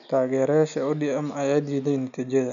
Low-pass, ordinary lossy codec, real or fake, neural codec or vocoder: 7.2 kHz; none; real; none